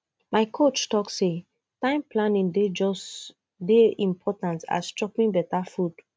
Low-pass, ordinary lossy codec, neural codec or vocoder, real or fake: none; none; none; real